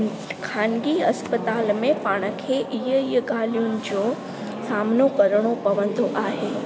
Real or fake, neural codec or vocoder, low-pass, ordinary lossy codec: real; none; none; none